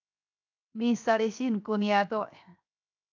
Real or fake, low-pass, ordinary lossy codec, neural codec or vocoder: fake; 7.2 kHz; AAC, 48 kbps; codec, 16 kHz, 0.7 kbps, FocalCodec